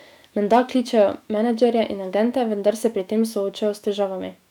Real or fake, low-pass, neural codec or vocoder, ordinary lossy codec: fake; 19.8 kHz; autoencoder, 48 kHz, 128 numbers a frame, DAC-VAE, trained on Japanese speech; none